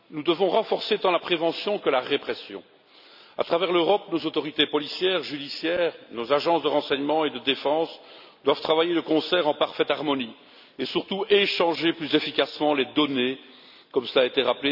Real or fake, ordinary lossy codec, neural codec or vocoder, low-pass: real; none; none; 5.4 kHz